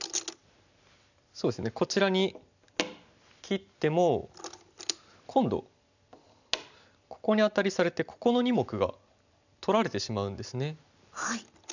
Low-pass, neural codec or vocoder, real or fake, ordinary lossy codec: 7.2 kHz; none; real; none